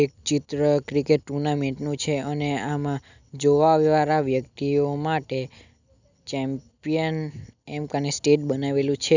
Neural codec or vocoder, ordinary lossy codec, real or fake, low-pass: none; none; real; 7.2 kHz